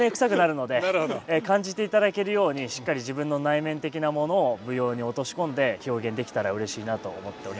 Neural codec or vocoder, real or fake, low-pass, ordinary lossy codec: none; real; none; none